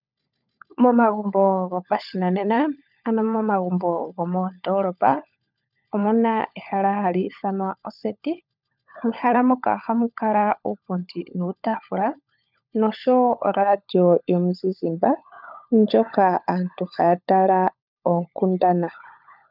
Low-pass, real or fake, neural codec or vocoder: 5.4 kHz; fake; codec, 16 kHz, 16 kbps, FunCodec, trained on LibriTTS, 50 frames a second